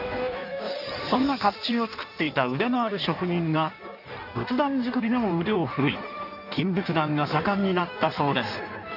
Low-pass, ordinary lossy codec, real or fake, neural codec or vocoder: 5.4 kHz; none; fake; codec, 16 kHz in and 24 kHz out, 1.1 kbps, FireRedTTS-2 codec